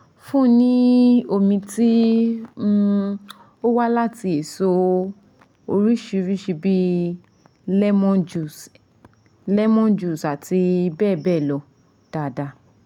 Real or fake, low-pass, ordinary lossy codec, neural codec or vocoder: real; 19.8 kHz; none; none